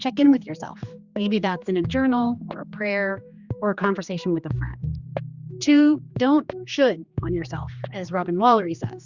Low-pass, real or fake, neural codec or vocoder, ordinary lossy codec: 7.2 kHz; fake; codec, 16 kHz, 2 kbps, X-Codec, HuBERT features, trained on general audio; Opus, 64 kbps